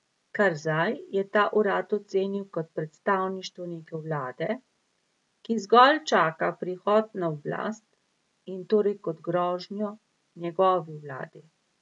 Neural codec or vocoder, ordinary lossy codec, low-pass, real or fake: none; none; 10.8 kHz; real